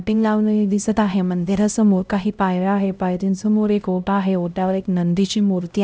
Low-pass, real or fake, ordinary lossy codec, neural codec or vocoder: none; fake; none; codec, 16 kHz, 0.5 kbps, X-Codec, HuBERT features, trained on LibriSpeech